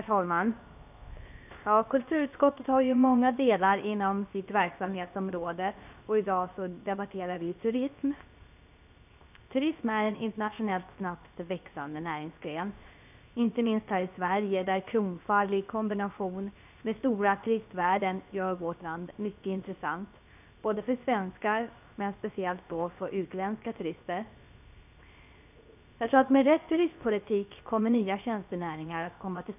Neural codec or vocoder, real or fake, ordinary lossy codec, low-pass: codec, 16 kHz, 0.7 kbps, FocalCodec; fake; none; 3.6 kHz